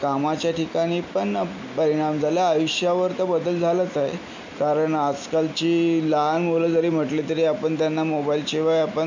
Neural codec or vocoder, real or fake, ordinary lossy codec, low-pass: none; real; MP3, 48 kbps; 7.2 kHz